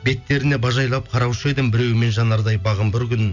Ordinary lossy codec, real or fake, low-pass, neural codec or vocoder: none; real; 7.2 kHz; none